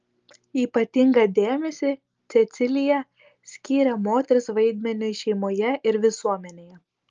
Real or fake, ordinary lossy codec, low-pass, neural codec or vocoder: real; Opus, 24 kbps; 7.2 kHz; none